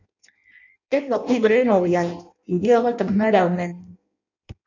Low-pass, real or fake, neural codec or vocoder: 7.2 kHz; fake; codec, 16 kHz in and 24 kHz out, 0.6 kbps, FireRedTTS-2 codec